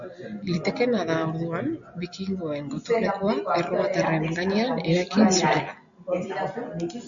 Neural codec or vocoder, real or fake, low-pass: none; real; 7.2 kHz